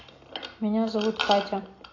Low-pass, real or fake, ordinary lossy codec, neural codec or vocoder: 7.2 kHz; real; AAC, 32 kbps; none